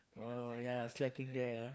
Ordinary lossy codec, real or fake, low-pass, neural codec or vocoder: none; fake; none; codec, 16 kHz, 2 kbps, FreqCodec, larger model